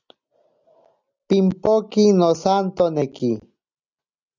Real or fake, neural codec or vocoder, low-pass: real; none; 7.2 kHz